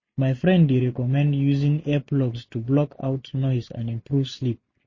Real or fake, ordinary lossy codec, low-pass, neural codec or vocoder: real; MP3, 32 kbps; 7.2 kHz; none